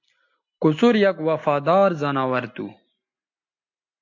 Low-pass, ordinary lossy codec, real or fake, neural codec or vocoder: 7.2 kHz; AAC, 48 kbps; real; none